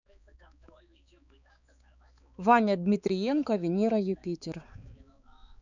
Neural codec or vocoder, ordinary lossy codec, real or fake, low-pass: codec, 16 kHz, 4 kbps, X-Codec, HuBERT features, trained on balanced general audio; none; fake; 7.2 kHz